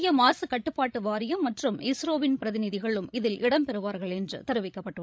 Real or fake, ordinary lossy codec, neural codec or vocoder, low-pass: fake; none; codec, 16 kHz, 16 kbps, FreqCodec, larger model; none